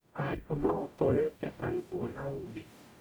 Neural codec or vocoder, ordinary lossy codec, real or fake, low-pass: codec, 44.1 kHz, 0.9 kbps, DAC; none; fake; none